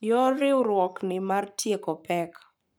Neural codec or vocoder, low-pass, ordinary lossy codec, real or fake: codec, 44.1 kHz, 7.8 kbps, Pupu-Codec; none; none; fake